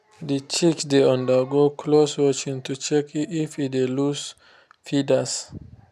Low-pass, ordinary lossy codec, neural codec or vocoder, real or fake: 14.4 kHz; none; none; real